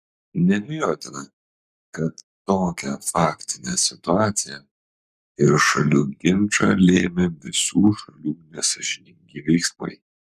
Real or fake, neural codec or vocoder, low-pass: fake; codec, 44.1 kHz, 7.8 kbps, Pupu-Codec; 14.4 kHz